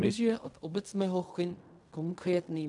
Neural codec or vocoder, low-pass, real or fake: codec, 16 kHz in and 24 kHz out, 0.4 kbps, LongCat-Audio-Codec, fine tuned four codebook decoder; 10.8 kHz; fake